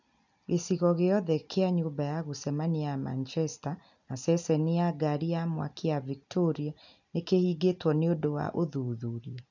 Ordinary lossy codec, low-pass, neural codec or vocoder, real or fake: none; 7.2 kHz; none; real